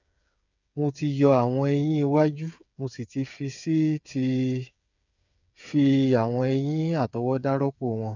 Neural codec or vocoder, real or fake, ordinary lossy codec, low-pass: codec, 16 kHz, 8 kbps, FreqCodec, smaller model; fake; none; 7.2 kHz